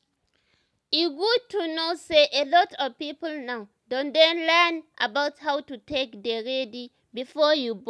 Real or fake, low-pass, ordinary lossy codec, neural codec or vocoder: real; none; none; none